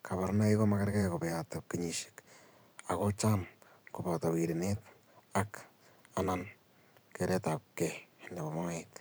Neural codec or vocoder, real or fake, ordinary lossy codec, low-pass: vocoder, 44.1 kHz, 128 mel bands every 512 samples, BigVGAN v2; fake; none; none